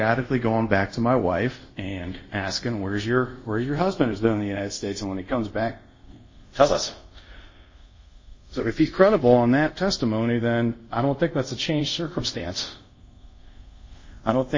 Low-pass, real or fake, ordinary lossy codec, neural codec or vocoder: 7.2 kHz; fake; MP3, 32 kbps; codec, 24 kHz, 0.5 kbps, DualCodec